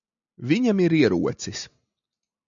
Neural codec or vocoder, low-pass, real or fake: none; 7.2 kHz; real